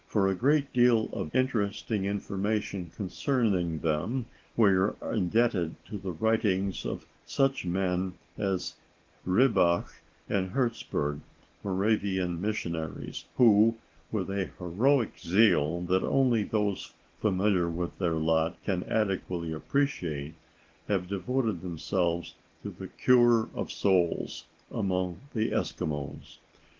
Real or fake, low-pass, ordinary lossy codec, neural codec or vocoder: real; 7.2 kHz; Opus, 16 kbps; none